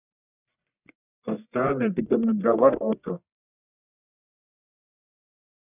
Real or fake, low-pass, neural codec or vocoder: fake; 3.6 kHz; codec, 44.1 kHz, 1.7 kbps, Pupu-Codec